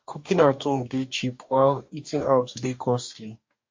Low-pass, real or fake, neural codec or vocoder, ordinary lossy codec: 7.2 kHz; fake; codec, 44.1 kHz, 2.6 kbps, DAC; MP3, 48 kbps